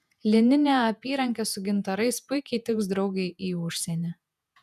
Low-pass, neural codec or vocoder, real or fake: 14.4 kHz; vocoder, 48 kHz, 128 mel bands, Vocos; fake